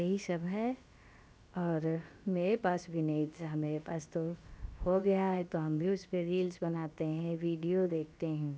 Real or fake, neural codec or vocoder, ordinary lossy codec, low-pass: fake; codec, 16 kHz, about 1 kbps, DyCAST, with the encoder's durations; none; none